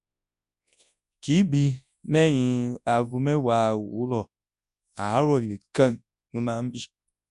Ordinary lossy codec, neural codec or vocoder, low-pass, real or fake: MP3, 96 kbps; codec, 24 kHz, 0.9 kbps, WavTokenizer, large speech release; 10.8 kHz; fake